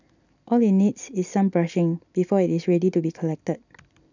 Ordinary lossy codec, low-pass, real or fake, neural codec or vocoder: none; 7.2 kHz; real; none